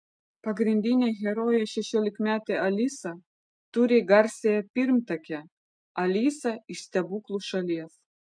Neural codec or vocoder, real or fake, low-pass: none; real; 9.9 kHz